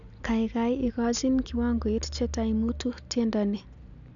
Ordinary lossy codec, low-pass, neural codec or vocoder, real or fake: none; 7.2 kHz; none; real